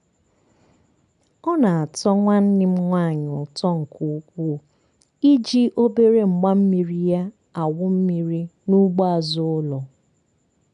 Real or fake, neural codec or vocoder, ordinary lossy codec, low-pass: real; none; none; 10.8 kHz